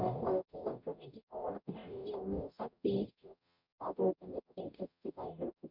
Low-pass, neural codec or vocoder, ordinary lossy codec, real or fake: 5.4 kHz; codec, 44.1 kHz, 0.9 kbps, DAC; none; fake